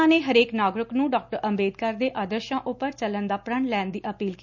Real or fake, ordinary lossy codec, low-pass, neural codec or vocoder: real; none; 7.2 kHz; none